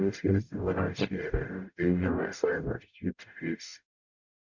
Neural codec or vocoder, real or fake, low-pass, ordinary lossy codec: codec, 44.1 kHz, 0.9 kbps, DAC; fake; 7.2 kHz; none